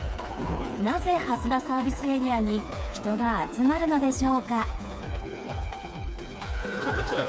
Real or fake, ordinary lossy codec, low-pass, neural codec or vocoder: fake; none; none; codec, 16 kHz, 4 kbps, FreqCodec, smaller model